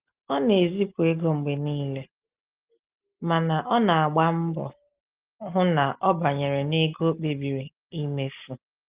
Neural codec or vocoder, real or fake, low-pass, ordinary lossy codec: none; real; 3.6 kHz; Opus, 32 kbps